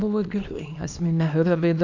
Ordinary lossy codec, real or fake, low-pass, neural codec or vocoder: AAC, 48 kbps; fake; 7.2 kHz; codec, 24 kHz, 0.9 kbps, WavTokenizer, small release